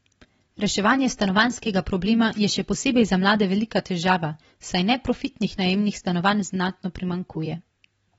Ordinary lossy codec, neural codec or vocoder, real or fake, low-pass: AAC, 24 kbps; vocoder, 44.1 kHz, 128 mel bands every 256 samples, BigVGAN v2; fake; 19.8 kHz